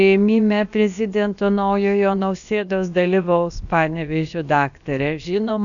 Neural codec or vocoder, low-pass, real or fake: codec, 16 kHz, about 1 kbps, DyCAST, with the encoder's durations; 7.2 kHz; fake